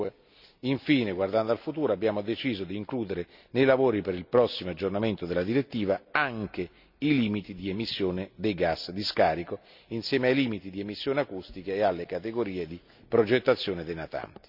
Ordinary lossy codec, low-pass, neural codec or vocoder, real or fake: none; 5.4 kHz; none; real